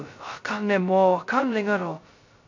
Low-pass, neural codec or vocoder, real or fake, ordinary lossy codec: 7.2 kHz; codec, 16 kHz, 0.2 kbps, FocalCodec; fake; MP3, 48 kbps